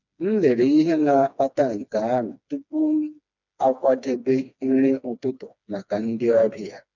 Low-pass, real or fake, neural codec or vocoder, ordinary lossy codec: 7.2 kHz; fake; codec, 16 kHz, 2 kbps, FreqCodec, smaller model; none